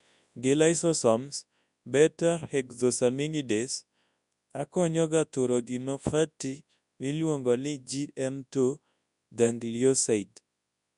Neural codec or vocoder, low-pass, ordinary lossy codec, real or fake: codec, 24 kHz, 0.9 kbps, WavTokenizer, large speech release; 10.8 kHz; none; fake